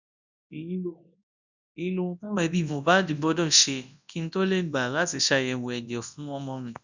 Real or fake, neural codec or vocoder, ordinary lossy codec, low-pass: fake; codec, 24 kHz, 0.9 kbps, WavTokenizer, large speech release; none; 7.2 kHz